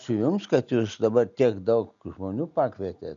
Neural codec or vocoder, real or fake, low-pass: none; real; 7.2 kHz